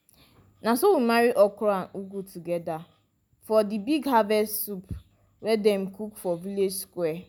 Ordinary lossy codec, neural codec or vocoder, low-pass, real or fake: none; none; none; real